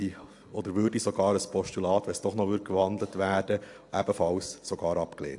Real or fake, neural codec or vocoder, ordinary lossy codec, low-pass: real; none; AAC, 64 kbps; 10.8 kHz